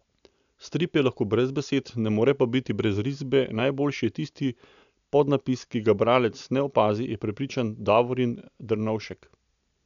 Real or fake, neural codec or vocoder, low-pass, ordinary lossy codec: real; none; 7.2 kHz; none